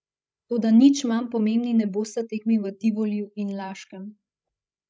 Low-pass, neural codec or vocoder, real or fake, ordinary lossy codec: none; codec, 16 kHz, 16 kbps, FreqCodec, larger model; fake; none